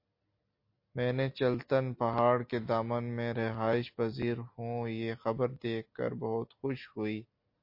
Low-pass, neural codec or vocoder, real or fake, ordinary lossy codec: 5.4 kHz; none; real; MP3, 32 kbps